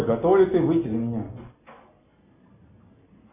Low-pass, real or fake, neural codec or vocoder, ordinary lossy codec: 3.6 kHz; real; none; MP3, 24 kbps